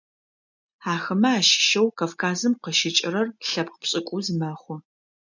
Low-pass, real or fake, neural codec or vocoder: 7.2 kHz; real; none